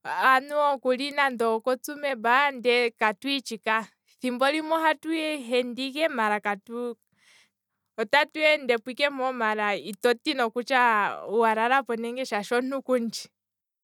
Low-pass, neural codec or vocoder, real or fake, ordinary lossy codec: 19.8 kHz; none; real; none